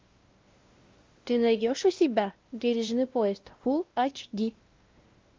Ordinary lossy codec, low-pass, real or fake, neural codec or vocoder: Opus, 32 kbps; 7.2 kHz; fake; codec, 16 kHz, 1 kbps, X-Codec, WavLM features, trained on Multilingual LibriSpeech